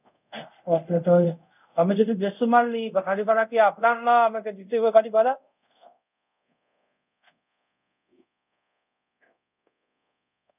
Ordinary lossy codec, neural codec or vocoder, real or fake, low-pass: none; codec, 24 kHz, 0.5 kbps, DualCodec; fake; 3.6 kHz